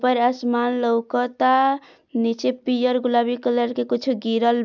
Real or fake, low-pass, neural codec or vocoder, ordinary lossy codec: real; 7.2 kHz; none; none